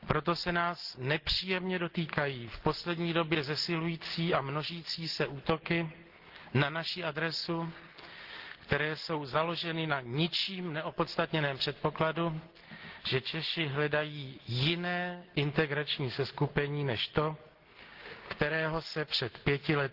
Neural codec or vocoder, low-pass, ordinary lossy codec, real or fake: none; 5.4 kHz; Opus, 16 kbps; real